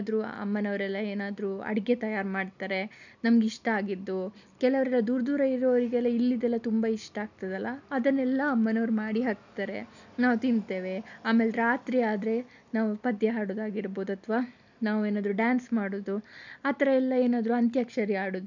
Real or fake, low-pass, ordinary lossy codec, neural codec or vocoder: real; 7.2 kHz; none; none